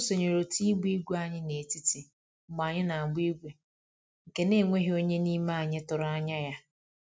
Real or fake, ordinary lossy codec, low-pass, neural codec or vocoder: real; none; none; none